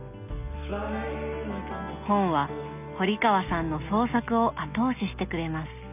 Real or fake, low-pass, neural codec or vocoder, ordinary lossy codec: real; 3.6 kHz; none; none